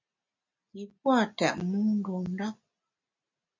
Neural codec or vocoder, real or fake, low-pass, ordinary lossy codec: none; real; 7.2 kHz; MP3, 48 kbps